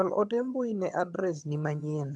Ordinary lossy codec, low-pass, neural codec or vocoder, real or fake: none; none; vocoder, 22.05 kHz, 80 mel bands, HiFi-GAN; fake